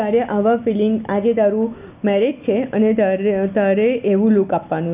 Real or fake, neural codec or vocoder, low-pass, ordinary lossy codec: real; none; 3.6 kHz; none